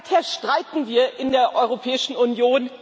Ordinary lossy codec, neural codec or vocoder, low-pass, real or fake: none; none; none; real